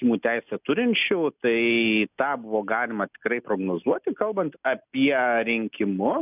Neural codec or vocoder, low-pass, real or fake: none; 3.6 kHz; real